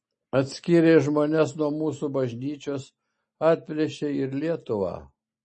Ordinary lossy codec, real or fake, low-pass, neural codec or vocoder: MP3, 32 kbps; real; 10.8 kHz; none